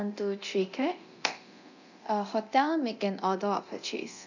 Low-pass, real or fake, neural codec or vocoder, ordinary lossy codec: 7.2 kHz; fake; codec, 24 kHz, 0.9 kbps, DualCodec; none